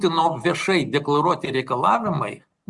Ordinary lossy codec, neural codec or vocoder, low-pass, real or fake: Opus, 64 kbps; none; 10.8 kHz; real